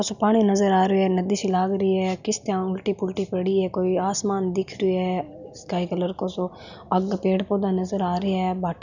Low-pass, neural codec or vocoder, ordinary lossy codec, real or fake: 7.2 kHz; none; none; real